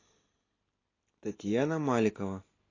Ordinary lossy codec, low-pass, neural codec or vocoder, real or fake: AAC, 32 kbps; 7.2 kHz; none; real